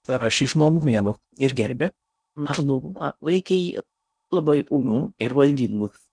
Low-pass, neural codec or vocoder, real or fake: 9.9 kHz; codec, 16 kHz in and 24 kHz out, 0.6 kbps, FocalCodec, streaming, 2048 codes; fake